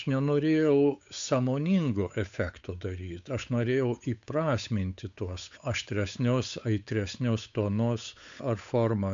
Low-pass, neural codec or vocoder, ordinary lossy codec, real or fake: 7.2 kHz; codec, 16 kHz, 8 kbps, FunCodec, trained on Chinese and English, 25 frames a second; MP3, 64 kbps; fake